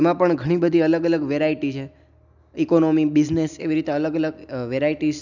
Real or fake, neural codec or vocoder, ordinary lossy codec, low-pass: real; none; none; 7.2 kHz